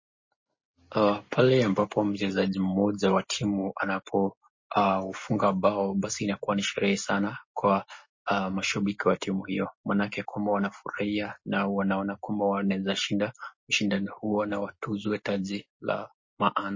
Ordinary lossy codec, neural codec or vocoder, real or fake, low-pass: MP3, 32 kbps; none; real; 7.2 kHz